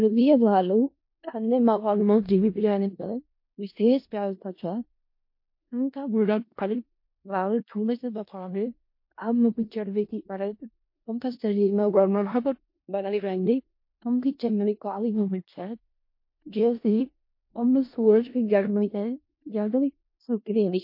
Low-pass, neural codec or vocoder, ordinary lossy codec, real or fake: 5.4 kHz; codec, 16 kHz in and 24 kHz out, 0.4 kbps, LongCat-Audio-Codec, four codebook decoder; MP3, 32 kbps; fake